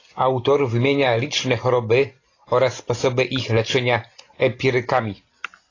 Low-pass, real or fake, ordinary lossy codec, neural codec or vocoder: 7.2 kHz; real; AAC, 32 kbps; none